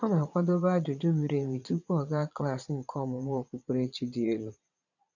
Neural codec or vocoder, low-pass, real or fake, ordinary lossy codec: vocoder, 22.05 kHz, 80 mel bands, Vocos; 7.2 kHz; fake; none